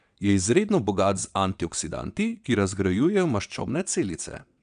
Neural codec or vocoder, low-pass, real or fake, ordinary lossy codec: vocoder, 22.05 kHz, 80 mel bands, WaveNeXt; 9.9 kHz; fake; none